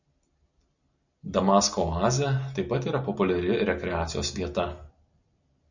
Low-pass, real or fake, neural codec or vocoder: 7.2 kHz; real; none